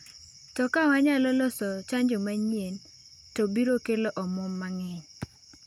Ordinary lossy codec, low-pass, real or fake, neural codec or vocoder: none; 19.8 kHz; real; none